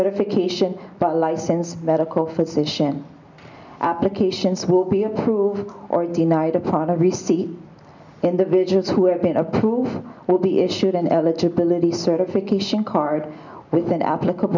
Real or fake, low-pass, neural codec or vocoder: real; 7.2 kHz; none